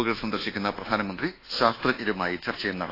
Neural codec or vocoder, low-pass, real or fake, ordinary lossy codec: codec, 24 kHz, 1.2 kbps, DualCodec; 5.4 kHz; fake; AAC, 24 kbps